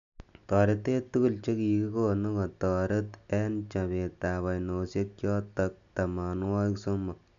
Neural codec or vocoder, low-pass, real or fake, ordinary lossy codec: none; 7.2 kHz; real; none